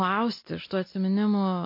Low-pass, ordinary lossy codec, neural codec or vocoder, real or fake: 5.4 kHz; MP3, 32 kbps; none; real